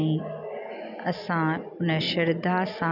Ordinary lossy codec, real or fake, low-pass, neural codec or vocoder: none; real; 5.4 kHz; none